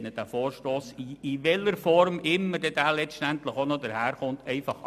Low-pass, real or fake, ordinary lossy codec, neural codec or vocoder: 14.4 kHz; real; MP3, 96 kbps; none